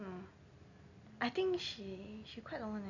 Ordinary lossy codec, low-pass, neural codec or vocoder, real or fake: none; 7.2 kHz; none; real